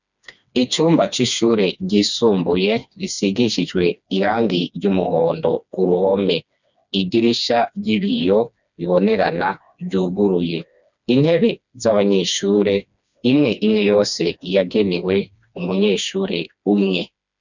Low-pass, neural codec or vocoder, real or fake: 7.2 kHz; codec, 16 kHz, 2 kbps, FreqCodec, smaller model; fake